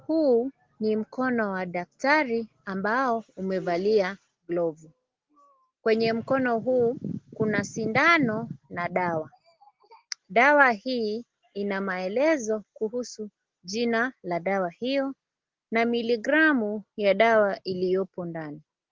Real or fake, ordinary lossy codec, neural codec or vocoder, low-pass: real; Opus, 16 kbps; none; 7.2 kHz